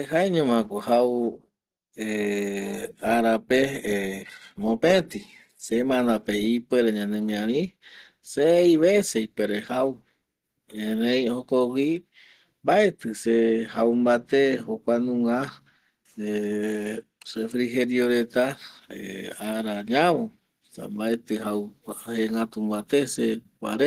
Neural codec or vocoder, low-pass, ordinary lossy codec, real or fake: none; 14.4 kHz; Opus, 16 kbps; real